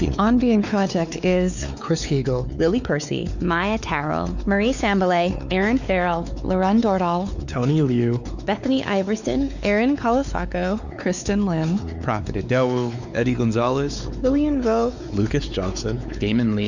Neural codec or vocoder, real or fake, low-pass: codec, 16 kHz, 4 kbps, X-Codec, WavLM features, trained on Multilingual LibriSpeech; fake; 7.2 kHz